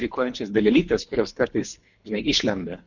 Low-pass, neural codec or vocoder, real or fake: 7.2 kHz; codec, 24 kHz, 3 kbps, HILCodec; fake